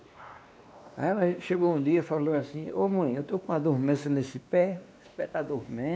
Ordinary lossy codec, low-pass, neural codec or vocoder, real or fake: none; none; codec, 16 kHz, 2 kbps, X-Codec, WavLM features, trained on Multilingual LibriSpeech; fake